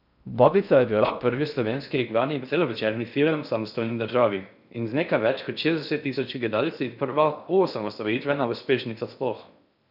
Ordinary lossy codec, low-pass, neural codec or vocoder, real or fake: none; 5.4 kHz; codec, 16 kHz in and 24 kHz out, 0.6 kbps, FocalCodec, streaming, 4096 codes; fake